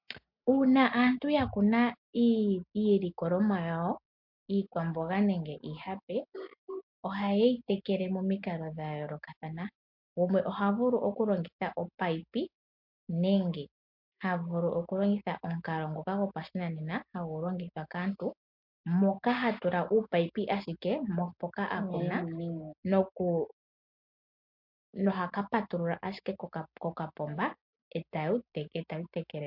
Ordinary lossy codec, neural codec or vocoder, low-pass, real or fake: MP3, 48 kbps; none; 5.4 kHz; real